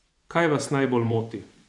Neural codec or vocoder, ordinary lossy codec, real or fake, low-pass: vocoder, 24 kHz, 100 mel bands, Vocos; none; fake; 10.8 kHz